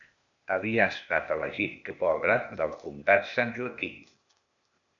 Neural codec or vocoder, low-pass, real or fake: codec, 16 kHz, 0.8 kbps, ZipCodec; 7.2 kHz; fake